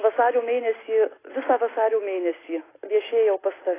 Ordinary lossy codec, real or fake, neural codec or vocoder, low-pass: AAC, 16 kbps; real; none; 3.6 kHz